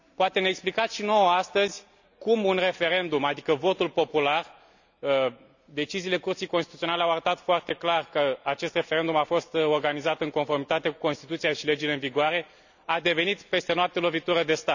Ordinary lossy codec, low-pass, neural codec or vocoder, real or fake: none; 7.2 kHz; none; real